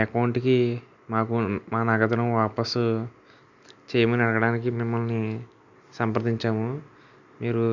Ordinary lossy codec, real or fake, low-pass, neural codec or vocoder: none; real; 7.2 kHz; none